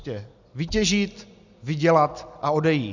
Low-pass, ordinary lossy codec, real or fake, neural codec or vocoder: 7.2 kHz; Opus, 64 kbps; real; none